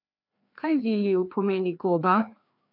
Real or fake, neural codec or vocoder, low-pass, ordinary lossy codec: fake; codec, 16 kHz, 2 kbps, FreqCodec, larger model; 5.4 kHz; none